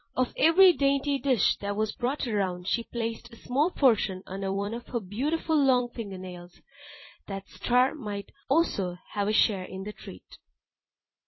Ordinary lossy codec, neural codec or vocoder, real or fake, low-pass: MP3, 24 kbps; vocoder, 44.1 kHz, 128 mel bands every 256 samples, BigVGAN v2; fake; 7.2 kHz